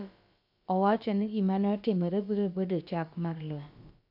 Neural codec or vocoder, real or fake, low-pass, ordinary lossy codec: codec, 16 kHz, about 1 kbps, DyCAST, with the encoder's durations; fake; 5.4 kHz; none